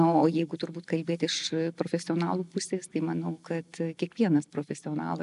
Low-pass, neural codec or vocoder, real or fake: 10.8 kHz; none; real